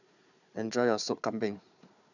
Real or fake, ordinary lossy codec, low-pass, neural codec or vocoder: fake; none; 7.2 kHz; codec, 16 kHz, 4 kbps, FunCodec, trained on Chinese and English, 50 frames a second